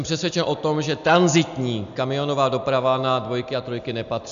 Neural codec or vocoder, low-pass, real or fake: none; 7.2 kHz; real